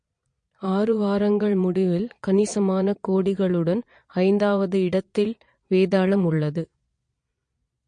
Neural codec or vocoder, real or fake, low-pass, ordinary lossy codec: vocoder, 22.05 kHz, 80 mel bands, Vocos; fake; 9.9 kHz; MP3, 48 kbps